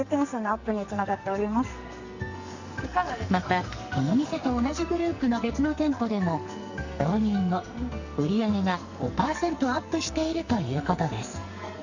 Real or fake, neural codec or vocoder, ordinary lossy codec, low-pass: fake; codec, 44.1 kHz, 2.6 kbps, SNAC; Opus, 64 kbps; 7.2 kHz